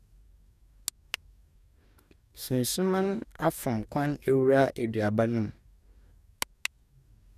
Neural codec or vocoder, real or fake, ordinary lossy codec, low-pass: codec, 44.1 kHz, 2.6 kbps, DAC; fake; none; 14.4 kHz